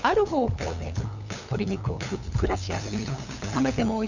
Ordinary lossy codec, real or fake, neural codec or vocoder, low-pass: none; fake; codec, 16 kHz, 8 kbps, FunCodec, trained on LibriTTS, 25 frames a second; 7.2 kHz